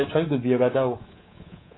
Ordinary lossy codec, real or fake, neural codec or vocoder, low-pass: AAC, 16 kbps; fake; codec, 24 kHz, 3.1 kbps, DualCodec; 7.2 kHz